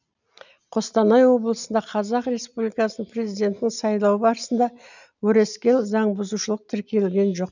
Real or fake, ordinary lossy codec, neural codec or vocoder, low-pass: real; none; none; 7.2 kHz